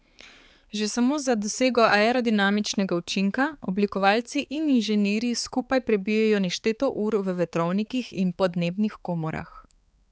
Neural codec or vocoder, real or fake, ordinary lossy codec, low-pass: codec, 16 kHz, 4 kbps, X-Codec, HuBERT features, trained on balanced general audio; fake; none; none